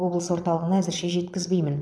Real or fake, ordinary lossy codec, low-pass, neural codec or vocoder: fake; none; none; vocoder, 22.05 kHz, 80 mel bands, Vocos